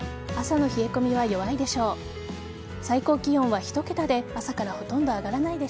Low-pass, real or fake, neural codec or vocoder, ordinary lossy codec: none; real; none; none